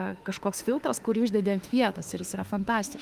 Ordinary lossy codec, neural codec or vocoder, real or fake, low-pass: Opus, 24 kbps; autoencoder, 48 kHz, 32 numbers a frame, DAC-VAE, trained on Japanese speech; fake; 14.4 kHz